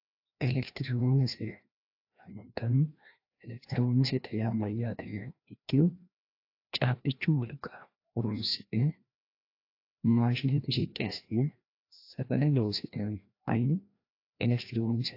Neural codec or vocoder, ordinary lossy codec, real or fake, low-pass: codec, 16 kHz, 1 kbps, FreqCodec, larger model; AAC, 32 kbps; fake; 5.4 kHz